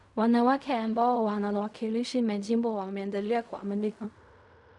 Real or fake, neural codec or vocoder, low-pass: fake; codec, 16 kHz in and 24 kHz out, 0.4 kbps, LongCat-Audio-Codec, fine tuned four codebook decoder; 10.8 kHz